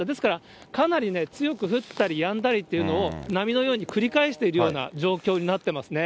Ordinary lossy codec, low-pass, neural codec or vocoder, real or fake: none; none; none; real